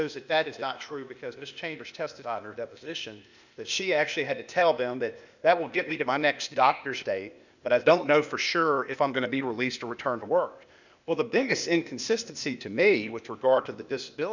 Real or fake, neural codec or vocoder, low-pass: fake; codec, 16 kHz, 0.8 kbps, ZipCodec; 7.2 kHz